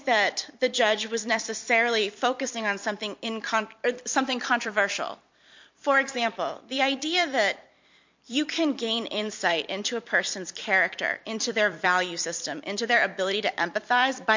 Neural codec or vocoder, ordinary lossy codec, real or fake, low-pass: vocoder, 44.1 kHz, 128 mel bands every 256 samples, BigVGAN v2; MP3, 48 kbps; fake; 7.2 kHz